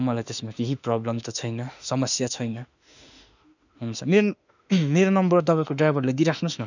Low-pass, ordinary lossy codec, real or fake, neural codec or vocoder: 7.2 kHz; none; fake; autoencoder, 48 kHz, 32 numbers a frame, DAC-VAE, trained on Japanese speech